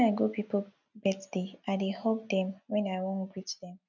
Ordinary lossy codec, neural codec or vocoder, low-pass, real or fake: none; none; 7.2 kHz; real